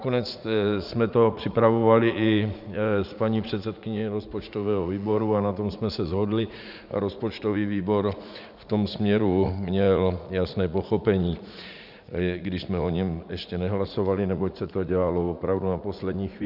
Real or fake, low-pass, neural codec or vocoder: fake; 5.4 kHz; vocoder, 44.1 kHz, 80 mel bands, Vocos